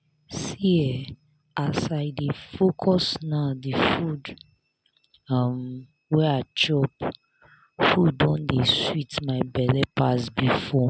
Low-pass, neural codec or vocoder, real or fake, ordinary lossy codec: none; none; real; none